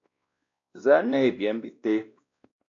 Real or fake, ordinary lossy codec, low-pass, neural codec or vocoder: fake; AAC, 48 kbps; 7.2 kHz; codec, 16 kHz, 1 kbps, X-Codec, WavLM features, trained on Multilingual LibriSpeech